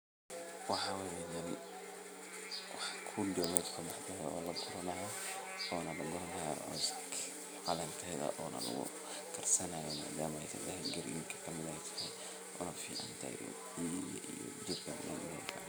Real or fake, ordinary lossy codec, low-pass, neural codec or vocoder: real; none; none; none